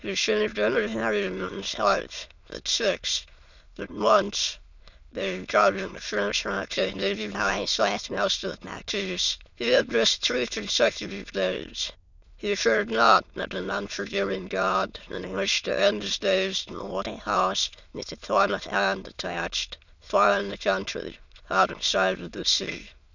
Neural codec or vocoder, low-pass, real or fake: autoencoder, 22.05 kHz, a latent of 192 numbers a frame, VITS, trained on many speakers; 7.2 kHz; fake